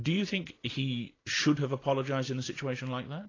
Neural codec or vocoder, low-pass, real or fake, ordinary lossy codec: none; 7.2 kHz; real; AAC, 32 kbps